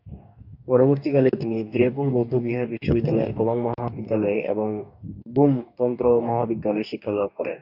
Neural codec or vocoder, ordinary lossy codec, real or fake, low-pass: codec, 44.1 kHz, 2.6 kbps, DAC; AAC, 48 kbps; fake; 5.4 kHz